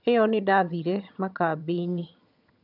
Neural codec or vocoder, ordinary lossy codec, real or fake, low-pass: vocoder, 22.05 kHz, 80 mel bands, HiFi-GAN; none; fake; 5.4 kHz